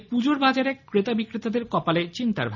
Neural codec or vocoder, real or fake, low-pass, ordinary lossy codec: none; real; 7.2 kHz; none